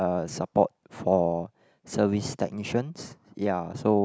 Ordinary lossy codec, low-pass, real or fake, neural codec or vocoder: none; none; real; none